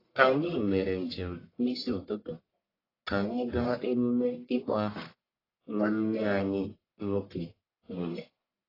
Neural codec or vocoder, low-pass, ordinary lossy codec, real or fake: codec, 44.1 kHz, 1.7 kbps, Pupu-Codec; 5.4 kHz; AAC, 24 kbps; fake